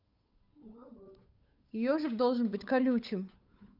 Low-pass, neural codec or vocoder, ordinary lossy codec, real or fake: 5.4 kHz; codec, 16 kHz, 8 kbps, FunCodec, trained on Chinese and English, 25 frames a second; none; fake